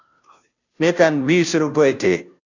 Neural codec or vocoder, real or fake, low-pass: codec, 16 kHz, 0.5 kbps, FunCodec, trained on Chinese and English, 25 frames a second; fake; 7.2 kHz